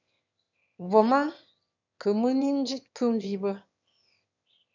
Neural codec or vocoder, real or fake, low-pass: autoencoder, 22.05 kHz, a latent of 192 numbers a frame, VITS, trained on one speaker; fake; 7.2 kHz